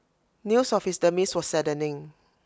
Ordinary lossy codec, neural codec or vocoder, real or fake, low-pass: none; none; real; none